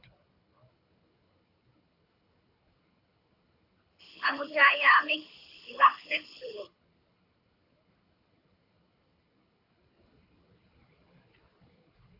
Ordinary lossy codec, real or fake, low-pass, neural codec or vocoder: AAC, 48 kbps; fake; 5.4 kHz; codec, 24 kHz, 6 kbps, HILCodec